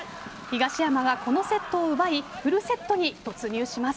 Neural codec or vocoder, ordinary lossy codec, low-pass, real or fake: none; none; none; real